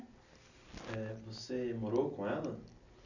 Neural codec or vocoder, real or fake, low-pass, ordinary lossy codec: none; real; 7.2 kHz; none